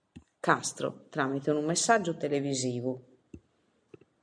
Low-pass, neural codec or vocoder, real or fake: 9.9 kHz; none; real